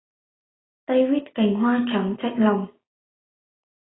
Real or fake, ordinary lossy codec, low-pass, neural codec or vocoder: real; AAC, 16 kbps; 7.2 kHz; none